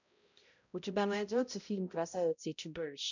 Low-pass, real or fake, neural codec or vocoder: 7.2 kHz; fake; codec, 16 kHz, 0.5 kbps, X-Codec, HuBERT features, trained on balanced general audio